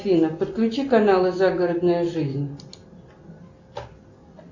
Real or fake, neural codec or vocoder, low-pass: real; none; 7.2 kHz